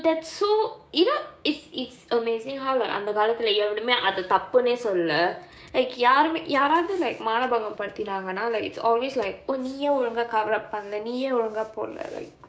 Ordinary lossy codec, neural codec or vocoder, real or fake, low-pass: none; codec, 16 kHz, 6 kbps, DAC; fake; none